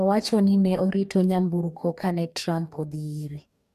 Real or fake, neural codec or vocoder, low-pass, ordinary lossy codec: fake; codec, 44.1 kHz, 2.6 kbps, DAC; 14.4 kHz; AAC, 96 kbps